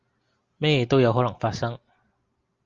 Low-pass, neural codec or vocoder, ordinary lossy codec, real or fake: 7.2 kHz; none; Opus, 32 kbps; real